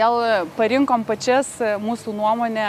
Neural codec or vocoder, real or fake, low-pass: none; real; 14.4 kHz